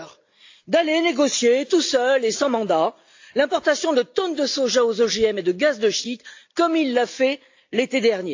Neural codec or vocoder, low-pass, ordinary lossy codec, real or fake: none; 7.2 kHz; AAC, 48 kbps; real